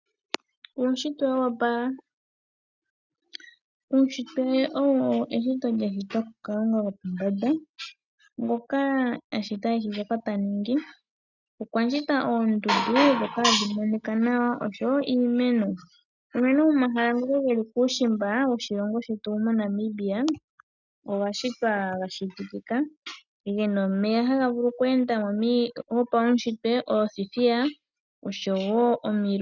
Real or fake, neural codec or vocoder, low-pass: real; none; 7.2 kHz